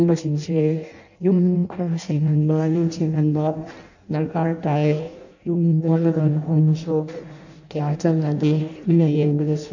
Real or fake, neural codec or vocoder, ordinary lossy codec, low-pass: fake; codec, 16 kHz in and 24 kHz out, 0.6 kbps, FireRedTTS-2 codec; none; 7.2 kHz